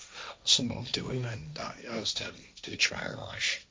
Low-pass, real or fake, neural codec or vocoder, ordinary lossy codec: none; fake; codec, 16 kHz, 1.1 kbps, Voila-Tokenizer; none